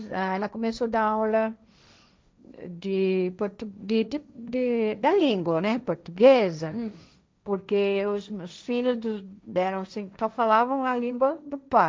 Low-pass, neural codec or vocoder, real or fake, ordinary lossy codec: none; codec, 16 kHz, 1.1 kbps, Voila-Tokenizer; fake; none